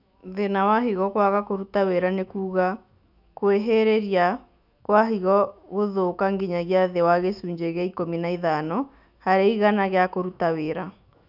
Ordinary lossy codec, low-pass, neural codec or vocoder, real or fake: none; 5.4 kHz; autoencoder, 48 kHz, 128 numbers a frame, DAC-VAE, trained on Japanese speech; fake